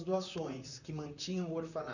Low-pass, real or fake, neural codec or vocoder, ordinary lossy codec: 7.2 kHz; fake; vocoder, 44.1 kHz, 128 mel bands, Pupu-Vocoder; none